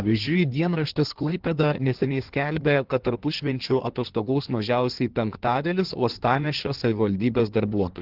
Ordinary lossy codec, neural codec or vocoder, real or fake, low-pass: Opus, 16 kbps; codec, 16 kHz in and 24 kHz out, 1.1 kbps, FireRedTTS-2 codec; fake; 5.4 kHz